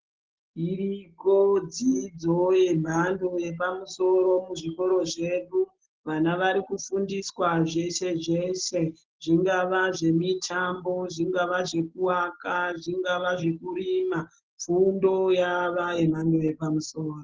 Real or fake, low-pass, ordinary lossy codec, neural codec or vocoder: real; 7.2 kHz; Opus, 16 kbps; none